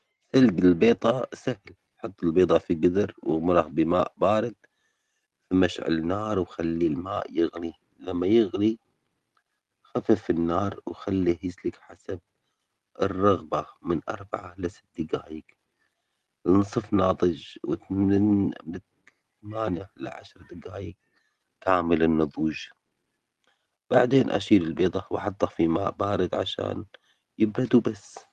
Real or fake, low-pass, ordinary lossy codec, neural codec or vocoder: real; 14.4 kHz; Opus, 24 kbps; none